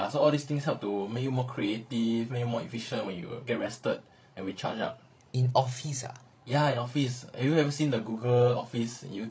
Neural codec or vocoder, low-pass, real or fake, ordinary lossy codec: codec, 16 kHz, 16 kbps, FreqCodec, larger model; none; fake; none